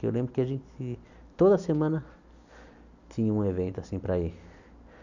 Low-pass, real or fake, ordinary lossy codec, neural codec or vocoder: 7.2 kHz; real; none; none